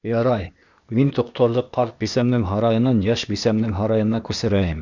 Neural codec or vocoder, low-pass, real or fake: codec, 16 kHz, 0.8 kbps, ZipCodec; 7.2 kHz; fake